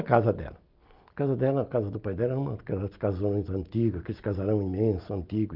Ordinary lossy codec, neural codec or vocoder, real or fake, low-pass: Opus, 32 kbps; none; real; 5.4 kHz